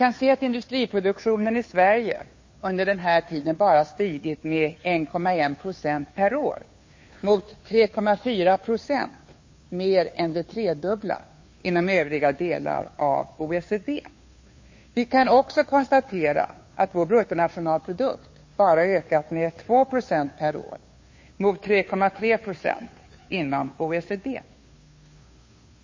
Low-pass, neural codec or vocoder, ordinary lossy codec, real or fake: 7.2 kHz; codec, 16 kHz, 2 kbps, FunCodec, trained on Chinese and English, 25 frames a second; MP3, 32 kbps; fake